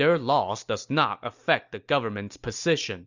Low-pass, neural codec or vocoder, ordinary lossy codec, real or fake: 7.2 kHz; none; Opus, 64 kbps; real